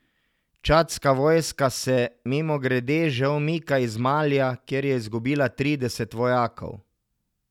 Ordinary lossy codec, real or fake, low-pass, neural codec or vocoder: none; real; 19.8 kHz; none